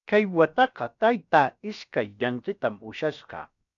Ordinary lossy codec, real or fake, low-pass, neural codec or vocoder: MP3, 96 kbps; fake; 7.2 kHz; codec, 16 kHz, about 1 kbps, DyCAST, with the encoder's durations